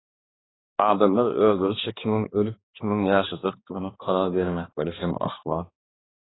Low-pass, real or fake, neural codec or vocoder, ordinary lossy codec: 7.2 kHz; fake; codec, 16 kHz, 2 kbps, X-Codec, HuBERT features, trained on balanced general audio; AAC, 16 kbps